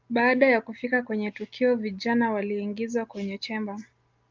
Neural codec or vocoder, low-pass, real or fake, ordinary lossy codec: none; 7.2 kHz; real; Opus, 32 kbps